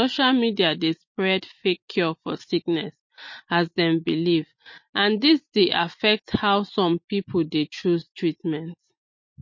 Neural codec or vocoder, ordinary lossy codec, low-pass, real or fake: none; MP3, 32 kbps; 7.2 kHz; real